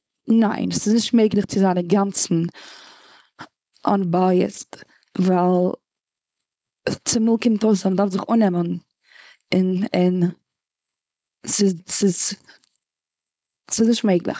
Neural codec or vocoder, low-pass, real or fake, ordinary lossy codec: codec, 16 kHz, 4.8 kbps, FACodec; none; fake; none